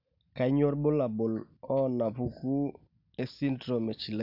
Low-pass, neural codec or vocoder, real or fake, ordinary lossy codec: 5.4 kHz; none; real; none